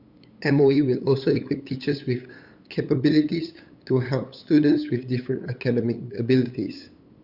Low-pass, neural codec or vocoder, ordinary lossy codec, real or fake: 5.4 kHz; codec, 16 kHz, 8 kbps, FunCodec, trained on LibriTTS, 25 frames a second; Opus, 64 kbps; fake